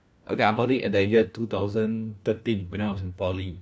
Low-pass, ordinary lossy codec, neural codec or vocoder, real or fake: none; none; codec, 16 kHz, 1 kbps, FunCodec, trained on LibriTTS, 50 frames a second; fake